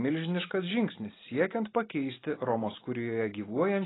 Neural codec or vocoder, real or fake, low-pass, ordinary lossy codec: none; real; 7.2 kHz; AAC, 16 kbps